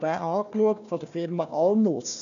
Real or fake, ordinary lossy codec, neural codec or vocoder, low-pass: fake; none; codec, 16 kHz, 1 kbps, FunCodec, trained on Chinese and English, 50 frames a second; 7.2 kHz